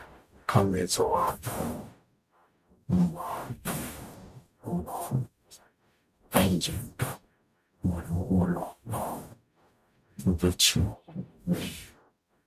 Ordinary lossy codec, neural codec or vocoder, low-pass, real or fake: none; codec, 44.1 kHz, 0.9 kbps, DAC; 14.4 kHz; fake